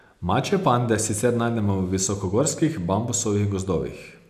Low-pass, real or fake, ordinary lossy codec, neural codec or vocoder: 14.4 kHz; real; none; none